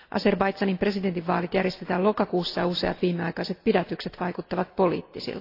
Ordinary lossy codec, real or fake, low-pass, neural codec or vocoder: AAC, 32 kbps; real; 5.4 kHz; none